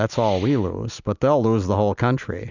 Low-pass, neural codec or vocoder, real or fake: 7.2 kHz; none; real